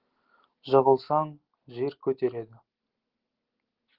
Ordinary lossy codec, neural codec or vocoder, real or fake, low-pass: Opus, 16 kbps; none; real; 5.4 kHz